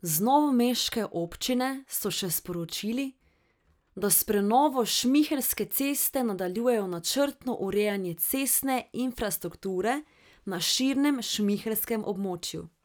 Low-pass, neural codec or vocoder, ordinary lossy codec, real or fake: none; none; none; real